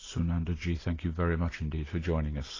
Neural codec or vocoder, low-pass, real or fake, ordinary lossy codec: none; 7.2 kHz; real; AAC, 32 kbps